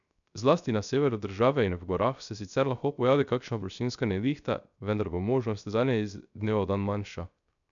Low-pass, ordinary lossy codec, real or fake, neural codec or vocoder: 7.2 kHz; none; fake; codec, 16 kHz, 0.3 kbps, FocalCodec